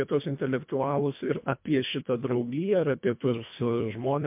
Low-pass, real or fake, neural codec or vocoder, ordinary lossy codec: 3.6 kHz; fake; codec, 24 kHz, 1.5 kbps, HILCodec; MP3, 32 kbps